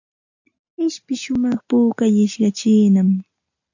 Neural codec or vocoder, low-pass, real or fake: none; 7.2 kHz; real